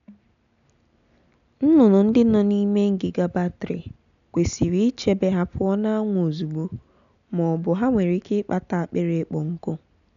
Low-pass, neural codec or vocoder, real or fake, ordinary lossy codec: 7.2 kHz; none; real; none